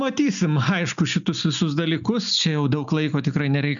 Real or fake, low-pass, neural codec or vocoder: real; 7.2 kHz; none